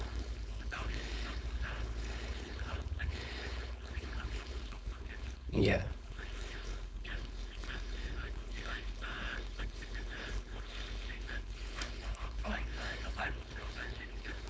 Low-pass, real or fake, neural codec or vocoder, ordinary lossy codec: none; fake; codec, 16 kHz, 4.8 kbps, FACodec; none